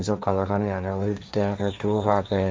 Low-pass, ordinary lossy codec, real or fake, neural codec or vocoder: none; none; fake; codec, 16 kHz, 1.1 kbps, Voila-Tokenizer